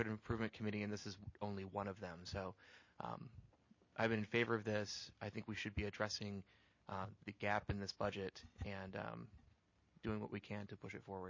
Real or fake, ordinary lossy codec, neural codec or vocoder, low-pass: real; MP3, 32 kbps; none; 7.2 kHz